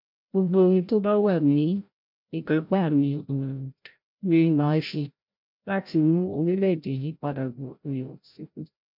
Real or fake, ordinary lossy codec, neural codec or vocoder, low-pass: fake; MP3, 48 kbps; codec, 16 kHz, 0.5 kbps, FreqCodec, larger model; 5.4 kHz